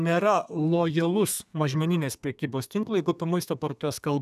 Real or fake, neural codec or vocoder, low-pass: fake; codec, 32 kHz, 1.9 kbps, SNAC; 14.4 kHz